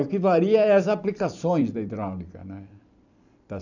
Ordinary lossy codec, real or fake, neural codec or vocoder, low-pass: none; fake; vocoder, 44.1 kHz, 128 mel bands every 256 samples, BigVGAN v2; 7.2 kHz